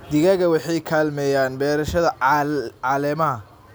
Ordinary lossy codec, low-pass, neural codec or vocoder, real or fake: none; none; none; real